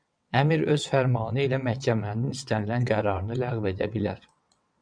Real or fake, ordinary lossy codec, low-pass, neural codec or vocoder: fake; MP3, 96 kbps; 9.9 kHz; vocoder, 22.05 kHz, 80 mel bands, WaveNeXt